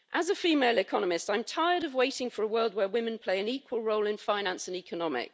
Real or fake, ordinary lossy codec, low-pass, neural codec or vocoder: real; none; none; none